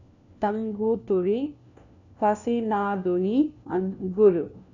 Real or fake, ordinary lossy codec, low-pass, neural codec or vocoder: fake; none; 7.2 kHz; codec, 16 kHz, 1 kbps, FunCodec, trained on LibriTTS, 50 frames a second